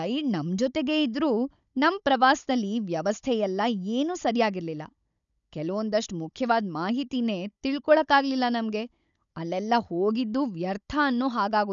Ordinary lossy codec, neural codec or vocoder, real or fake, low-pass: none; codec, 16 kHz, 16 kbps, FunCodec, trained on Chinese and English, 50 frames a second; fake; 7.2 kHz